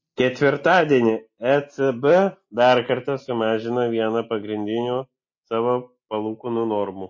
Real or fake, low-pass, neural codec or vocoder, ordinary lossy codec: real; 7.2 kHz; none; MP3, 32 kbps